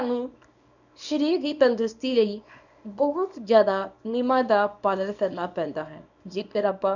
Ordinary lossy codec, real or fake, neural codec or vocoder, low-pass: none; fake; codec, 24 kHz, 0.9 kbps, WavTokenizer, small release; 7.2 kHz